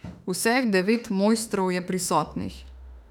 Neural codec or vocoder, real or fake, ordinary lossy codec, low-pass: autoencoder, 48 kHz, 32 numbers a frame, DAC-VAE, trained on Japanese speech; fake; none; 19.8 kHz